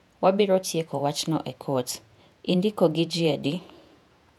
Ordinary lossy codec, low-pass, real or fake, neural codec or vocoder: none; 19.8 kHz; fake; vocoder, 48 kHz, 128 mel bands, Vocos